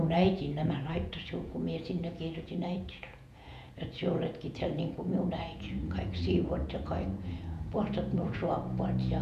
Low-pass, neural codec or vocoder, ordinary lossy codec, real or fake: 14.4 kHz; vocoder, 48 kHz, 128 mel bands, Vocos; none; fake